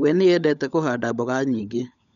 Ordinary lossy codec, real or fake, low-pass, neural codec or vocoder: none; fake; 7.2 kHz; codec, 16 kHz, 16 kbps, FunCodec, trained on LibriTTS, 50 frames a second